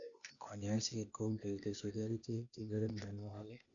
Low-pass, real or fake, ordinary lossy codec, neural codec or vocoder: 7.2 kHz; fake; none; codec, 16 kHz, 0.8 kbps, ZipCodec